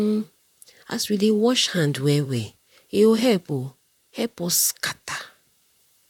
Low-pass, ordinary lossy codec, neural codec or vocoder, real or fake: 19.8 kHz; none; vocoder, 44.1 kHz, 128 mel bands, Pupu-Vocoder; fake